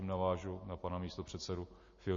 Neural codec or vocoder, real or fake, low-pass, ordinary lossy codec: none; real; 7.2 kHz; MP3, 32 kbps